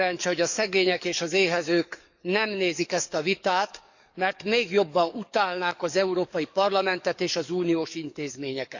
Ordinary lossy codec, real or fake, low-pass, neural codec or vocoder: none; fake; 7.2 kHz; codec, 44.1 kHz, 7.8 kbps, DAC